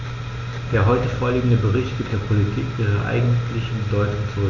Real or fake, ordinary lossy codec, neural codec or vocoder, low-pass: real; none; none; 7.2 kHz